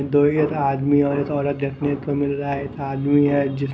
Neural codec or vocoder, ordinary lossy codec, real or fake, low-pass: none; none; real; none